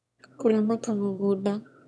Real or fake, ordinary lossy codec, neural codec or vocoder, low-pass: fake; none; autoencoder, 22.05 kHz, a latent of 192 numbers a frame, VITS, trained on one speaker; none